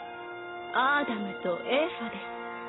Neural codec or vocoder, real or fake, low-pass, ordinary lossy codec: none; real; 7.2 kHz; AAC, 16 kbps